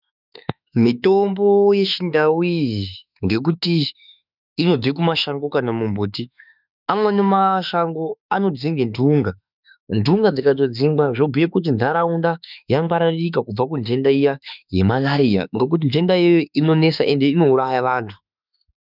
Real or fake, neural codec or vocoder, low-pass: fake; autoencoder, 48 kHz, 32 numbers a frame, DAC-VAE, trained on Japanese speech; 5.4 kHz